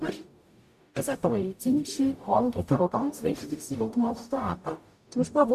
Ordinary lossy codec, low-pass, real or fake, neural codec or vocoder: MP3, 96 kbps; 14.4 kHz; fake; codec, 44.1 kHz, 0.9 kbps, DAC